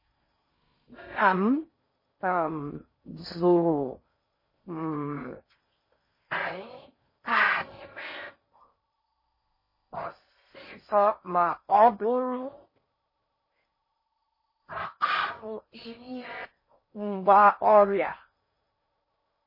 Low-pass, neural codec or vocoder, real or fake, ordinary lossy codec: 5.4 kHz; codec, 16 kHz in and 24 kHz out, 0.6 kbps, FocalCodec, streaming, 4096 codes; fake; MP3, 24 kbps